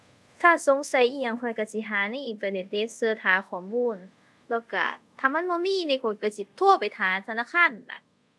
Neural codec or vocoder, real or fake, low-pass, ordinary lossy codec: codec, 24 kHz, 0.5 kbps, DualCodec; fake; none; none